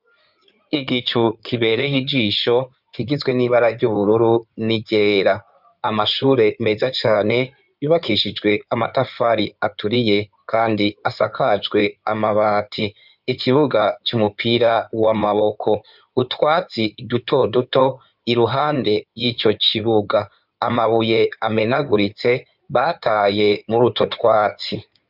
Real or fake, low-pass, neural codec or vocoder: fake; 5.4 kHz; codec, 16 kHz in and 24 kHz out, 2.2 kbps, FireRedTTS-2 codec